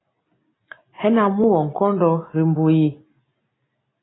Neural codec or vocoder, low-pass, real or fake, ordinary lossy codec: none; 7.2 kHz; real; AAC, 16 kbps